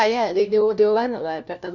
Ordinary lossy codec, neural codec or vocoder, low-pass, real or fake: none; codec, 16 kHz, 1 kbps, FunCodec, trained on LibriTTS, 50 frames a second; 7.2 kHz; fake